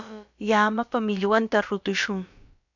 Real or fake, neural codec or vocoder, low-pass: fake; codec, 16 kHz, about 1 kbps, DyCAST, with the encoder's durations; 7.2 kHz